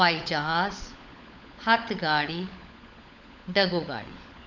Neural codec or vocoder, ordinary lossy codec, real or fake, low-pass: codec, 16 kHz, 16 kbps, FunCodec, trained on LibriTTS, 50 frames a second; none; fake; 7.2 kHz